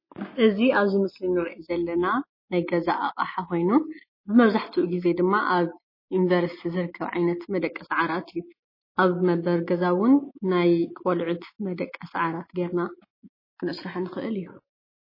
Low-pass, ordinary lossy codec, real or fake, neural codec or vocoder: 5.4 kHz; MP3, 24 kbps; real; none